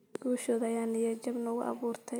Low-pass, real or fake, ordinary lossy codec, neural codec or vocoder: none; real; none; none